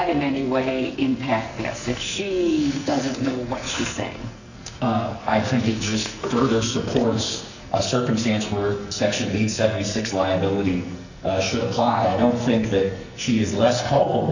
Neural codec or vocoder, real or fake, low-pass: codec, 44.1 kHz, 2.6 kbps, SNAC; fake; 7.2 kHz